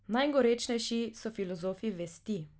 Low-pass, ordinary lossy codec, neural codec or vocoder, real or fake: none; none; none; real